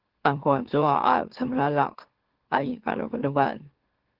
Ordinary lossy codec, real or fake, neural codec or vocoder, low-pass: Opus, 16 kbps; fake; autoencoder, 44.1 kHz, a latent of 192 numbers a frame, MeloTTS; 5.4 kHz